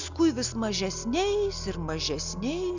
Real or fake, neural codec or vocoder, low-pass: real; none; 7.2 kHz